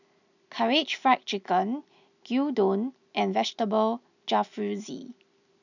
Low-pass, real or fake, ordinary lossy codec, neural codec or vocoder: 7.2 kHz; real; none; none